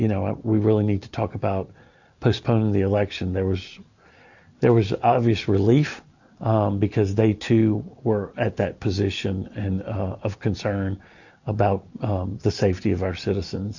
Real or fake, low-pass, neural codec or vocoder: real; 7.2 kHz; none